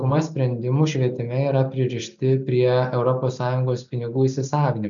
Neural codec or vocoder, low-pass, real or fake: none; 7.2 kHz; real